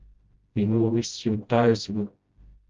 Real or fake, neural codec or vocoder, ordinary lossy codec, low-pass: fake; codec, 16 kHz, 0.5 kbps, FreqCodec, smaller model; Opus, 32 kbps; 7.2 kHz